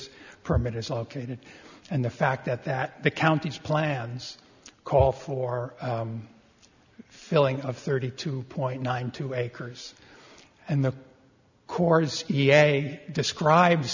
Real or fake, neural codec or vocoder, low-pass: real; none; 7.2 kHz